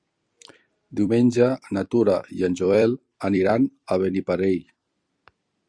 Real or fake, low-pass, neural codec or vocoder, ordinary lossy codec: fake; 9.9 kHz; vocoder, 44.1 kHz, 128 mel bands every 256 samples, BigVGAN v2; Opus, 64 kbps